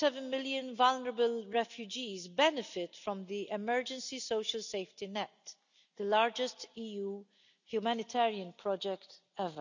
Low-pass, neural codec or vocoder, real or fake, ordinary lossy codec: 7.2 kHz; none; real; none